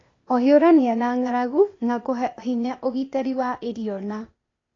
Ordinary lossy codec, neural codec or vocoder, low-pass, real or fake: AAC, 32 kbps; codec, 16 kHz, 0.8 kbps, ZipCodec; 7.2 kHz; fake